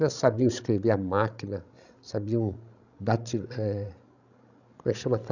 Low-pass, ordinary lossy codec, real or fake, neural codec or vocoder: 7.2 kHz; none; fake; codec, 16 kHz, 16 kbps, FreqCodec, larger model